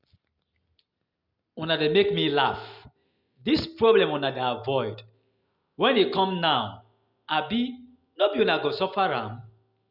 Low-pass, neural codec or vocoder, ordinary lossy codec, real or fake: 5.4 kHz; none; Opus, 64 kbps; real